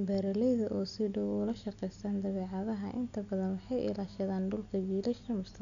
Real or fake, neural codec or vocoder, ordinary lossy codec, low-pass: real; none; none; 7.2 kHz